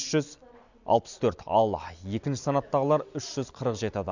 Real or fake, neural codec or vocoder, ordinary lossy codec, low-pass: real; none; none; 7.2 kHz